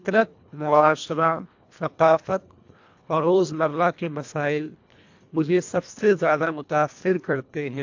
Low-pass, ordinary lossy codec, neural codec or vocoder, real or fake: 7.2 kHz; AAC, 48 kbps; codec, 24 kHz, 1.5 kbps, HILCodec; fake